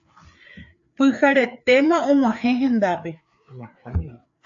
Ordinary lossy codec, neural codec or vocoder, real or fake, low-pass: AAC, 64 kbps; codec, 16 kHz, 4 kbps, FreqCodec, larger model; fake; 7.2 kHz